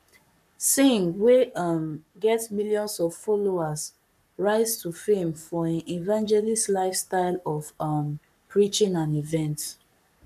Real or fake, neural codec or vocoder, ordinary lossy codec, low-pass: fake; codec, 44.1 kHz, 7.8 kbps, Pupu-Codec; none; 14.4 kHz